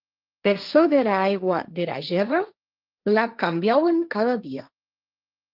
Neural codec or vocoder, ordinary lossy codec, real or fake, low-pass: codec, 16 kHz, 1.1 kbps, Voila-Tokenizer; Opus, 24 kbps; fake; 5.4 kHz